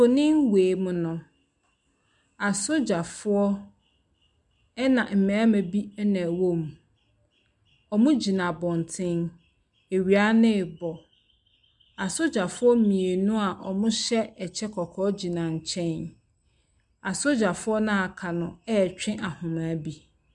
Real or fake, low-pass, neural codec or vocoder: real; 10.8 kHz; none